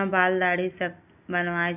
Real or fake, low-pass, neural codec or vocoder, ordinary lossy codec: real; 3.6 kHz; none; none